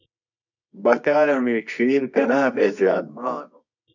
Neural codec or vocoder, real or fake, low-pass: codec, 24 kHz, 0.9 kbps, WavTokenizer, medium music audio release; fake; 7.2 kHz